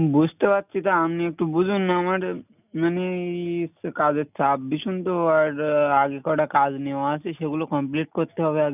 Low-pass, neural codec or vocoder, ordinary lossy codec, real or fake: 3.6 kHz; none; none; real